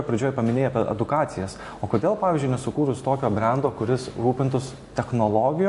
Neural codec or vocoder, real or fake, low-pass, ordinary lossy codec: none; real; 14.4 kHz; MP3, 48 kbps